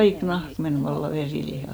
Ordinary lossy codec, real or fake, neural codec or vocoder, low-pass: none; real; none; none